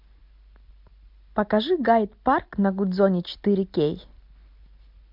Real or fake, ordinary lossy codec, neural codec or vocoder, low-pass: real; MP3, 48 kbps; none; 5.4 kHz